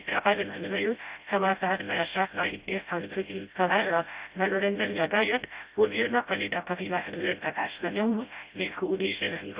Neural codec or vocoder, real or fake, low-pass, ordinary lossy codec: codec, 16 kHz, 0.5 kbps, FreqCodec, smaller model; fake; 3.6 kHz; Opus, 64 kbps